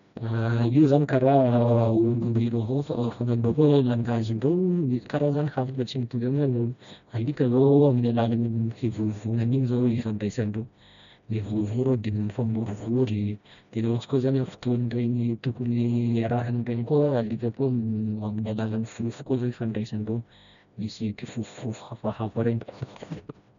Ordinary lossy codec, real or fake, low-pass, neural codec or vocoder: none; fake; 7.2 kHz; codec, 16 kHz, 1 kbps, FreqCodec, smaller model